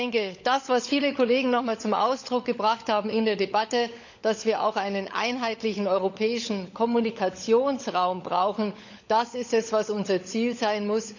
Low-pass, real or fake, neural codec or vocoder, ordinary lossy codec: 7.2 kHz; fake; codec, 16 kHz, 16 kbps, FunCodec, trained on LibriTTS, 50 frames a second; none